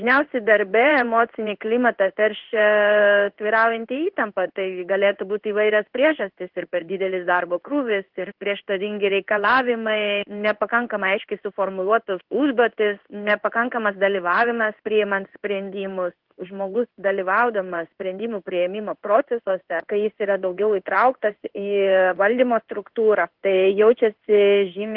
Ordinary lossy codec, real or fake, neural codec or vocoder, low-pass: Opus, 16 kbps; fake; codec, 16 kHz in and 24 kHz out, 1 kbps, XY-Tokenizer; 5.4 kHz